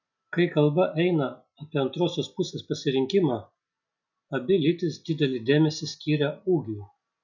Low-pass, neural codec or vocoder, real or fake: 7.2 kHz; none; real